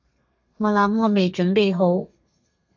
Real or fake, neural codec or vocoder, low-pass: fake; codec, 32 kHz, 1.9 kbps, SNAC; 7.2 kHz